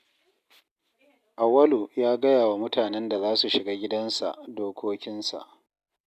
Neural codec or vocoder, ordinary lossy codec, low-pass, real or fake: none; none; 14.4 kHz; real